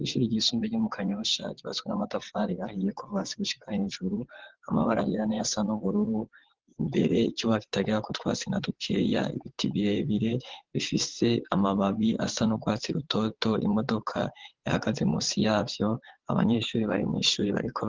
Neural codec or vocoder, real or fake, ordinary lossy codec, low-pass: vocoder, 22.05 kHz, 80 mel bands, WaveNeXt; fake; Opus, 16 kbps; 7.2 kHz